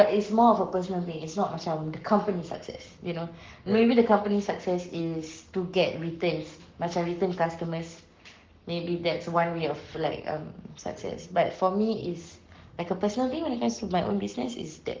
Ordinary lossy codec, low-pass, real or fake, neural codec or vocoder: Opus, 32 kbps; 7.2 kHz; fake; codec, 44.1 kHz, 7.8 kbps, Pupu-Codec